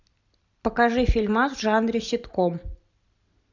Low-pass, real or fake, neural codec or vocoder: 7.2 kHz; real; none